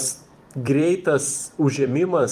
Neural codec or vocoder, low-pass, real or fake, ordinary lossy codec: vocoder, 44.1 kHz, 128 mel bands every 256 samples, BigVGAN v2; 14.4 kHz; fake; Opus, 32 kbps